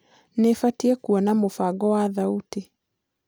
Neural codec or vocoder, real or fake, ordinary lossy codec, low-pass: none; real; none; none